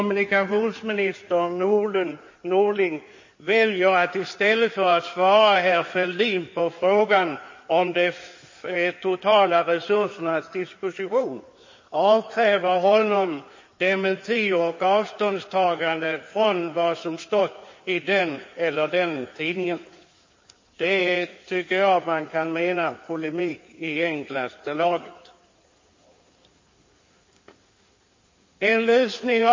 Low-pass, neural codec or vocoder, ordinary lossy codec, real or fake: 7.2 kHz; codec, 16 kHz in and 24 kHz out, 2.2 kbps, FireRedTTS-2 codec; MP3, 32 kbps; fake